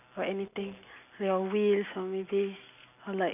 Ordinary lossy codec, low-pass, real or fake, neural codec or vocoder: none; 3.6 kHz; real; none